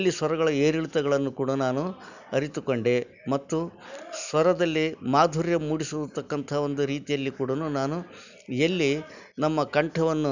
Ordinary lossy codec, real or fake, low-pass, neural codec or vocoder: none; real; 7.2 kHz; none